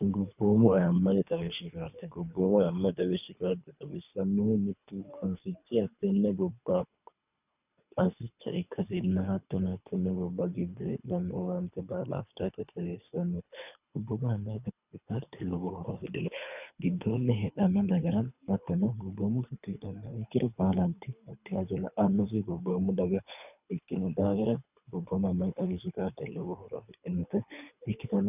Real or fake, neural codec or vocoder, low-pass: fake; codec, 24 kHz, 3 kbps, HILCodec; 3.6 kHz